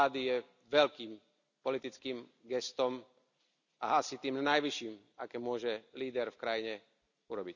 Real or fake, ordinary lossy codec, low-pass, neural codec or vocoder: real; none; 7.2 kHz; none